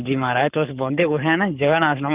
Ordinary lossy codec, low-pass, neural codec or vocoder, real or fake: Opus, 32 kbps; 3.6 kHz; codec, 44.1 kHz, 7.8 kbps, Pupu-Codec; fake